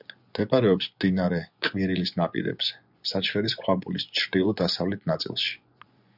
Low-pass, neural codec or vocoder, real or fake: 5.4 kHz; vocoder, 24 kHz, 100 mel bands, Vocos; fake